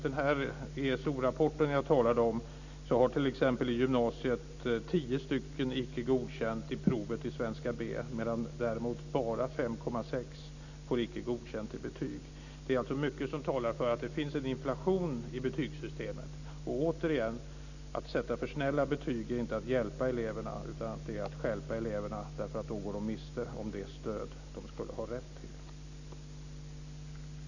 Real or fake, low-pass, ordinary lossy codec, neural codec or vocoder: real; 7.2 kHz; none; none